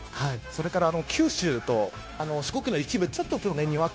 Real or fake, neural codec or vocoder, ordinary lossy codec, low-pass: fake; codec, 16 kHz, 0.9 kbps, LongCat-Audio-Codec; none; none